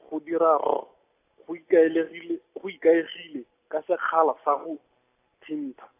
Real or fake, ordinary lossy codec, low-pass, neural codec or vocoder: real; AAC, 24 kbps; 3.6 kHz; none